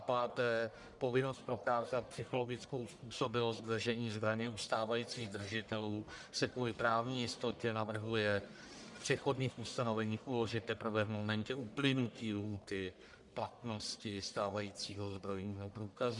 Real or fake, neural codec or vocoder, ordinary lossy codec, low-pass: fake; codec, 44.1 kHz, 1.7 kbps, Pupu-Codec; MP3, 96 kbps; 10.8 kHz